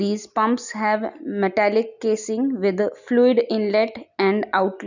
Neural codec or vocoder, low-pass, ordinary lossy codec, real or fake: none; 7.2 kHz; none; real